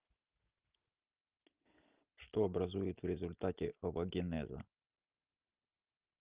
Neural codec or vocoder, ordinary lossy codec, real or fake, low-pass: none; Opus, 24 kbps; real; 3.6 kHz